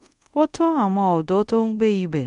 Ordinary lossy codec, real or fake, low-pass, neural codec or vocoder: MP3, 64 kbps; fake; 10.8 kHz; codec, 24 kHz, 0.5 kbps, DualCodec